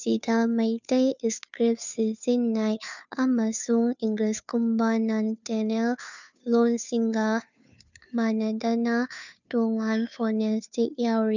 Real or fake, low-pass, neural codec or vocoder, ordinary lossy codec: fake; 7.2 kHz; codec, 16 kHz, 2 kbps, FunCodec, trained on Chinese and English, 25 frames a second; none